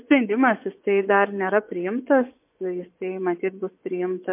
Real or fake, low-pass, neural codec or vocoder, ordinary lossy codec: fake; 3.6 kHz; vocoder, 24 kHz, 100 mel bands, Vocos; MP3, 32 kbps